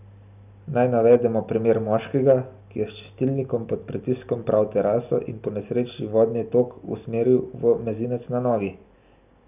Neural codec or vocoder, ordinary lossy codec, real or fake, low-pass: none; none; real; 3.6 kHz